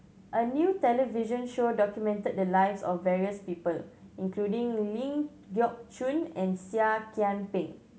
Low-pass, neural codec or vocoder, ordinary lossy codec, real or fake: none; none; none; real